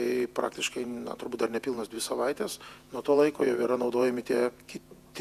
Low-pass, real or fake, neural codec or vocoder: 14.4 kHz; fake; vocoder, 48 kHz, 128 mel bands, Vocos